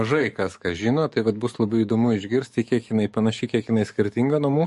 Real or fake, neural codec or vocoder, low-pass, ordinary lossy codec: fake; codec, 44.1 kHz, 7.8 kbps, DAC; 14.4 kHz; MP3, 48 kbps